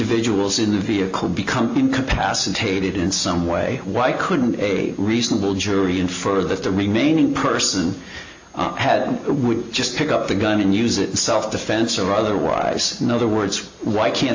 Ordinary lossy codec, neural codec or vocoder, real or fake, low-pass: AAC, 48 kbps; none; real; 7.2 kHz